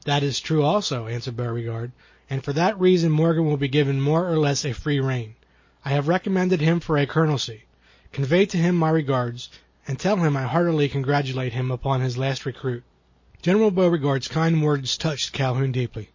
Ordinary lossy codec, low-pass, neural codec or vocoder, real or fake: MP3, 32 kbps; 7.2 kHz; none; real